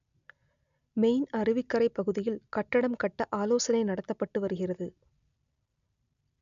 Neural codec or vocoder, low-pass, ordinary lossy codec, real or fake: none; 7.2 kHz; none; real